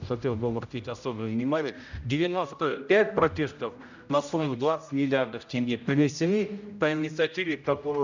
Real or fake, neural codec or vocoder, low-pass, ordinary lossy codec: fake; codec, 16 kHz, 0.5 kbps, X-Codec, HuBERT features, trained on general audio; 7.2 kHz; none